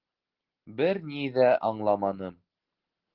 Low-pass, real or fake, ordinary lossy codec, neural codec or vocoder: 5.4 kHz; real; Opus, 16 kbps; none